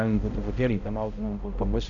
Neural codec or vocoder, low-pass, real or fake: codec, 16 kHz, 0.5 kbps, X-Codec, HuBERT features, trained on balanced general audio; 7.2 kHz; fake